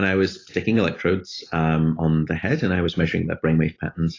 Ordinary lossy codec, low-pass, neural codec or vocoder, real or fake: AAC, 32 kbps; 7.2 kHz; none; real